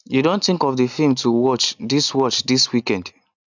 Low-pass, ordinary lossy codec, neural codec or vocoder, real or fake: 7.2 kHz; none; none; real